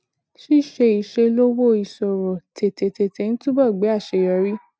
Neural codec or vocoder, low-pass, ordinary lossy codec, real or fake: none; none; none; real